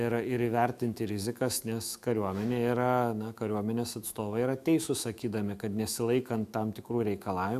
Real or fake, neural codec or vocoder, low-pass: fake; autoencoder, 48 kHz, 128 numbers a frame, DAC-VAE, trained on Japanese speech; 14.4 kHz